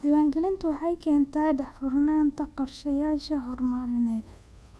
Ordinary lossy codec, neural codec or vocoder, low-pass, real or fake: none; codec, 24 kHz, 1.2 kbps, DualCodec; none; fake